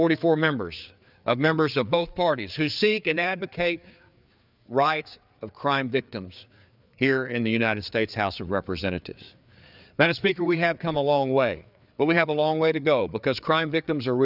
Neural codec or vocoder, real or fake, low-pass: codec, 16 kHz, 4 kbps, FreqCodec, larger model; fake; 5.4 kHz